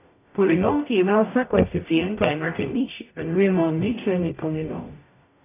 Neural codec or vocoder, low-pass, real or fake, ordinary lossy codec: codec, 44.1 kHz, 0.9 kbps, DAC; 3.6 kHz; fake; none